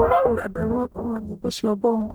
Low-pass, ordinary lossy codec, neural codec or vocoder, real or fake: none; none; codec, 44.1 kHz, 0.9 kbps, DAC; fake